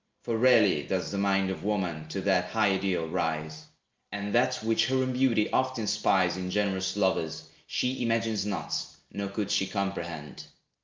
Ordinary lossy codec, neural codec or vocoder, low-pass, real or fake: Opus, 24 kbps; none; 7.2 kHz; real